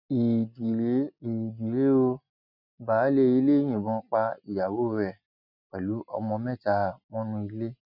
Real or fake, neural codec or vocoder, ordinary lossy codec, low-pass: real; none; none; 5.4 kHz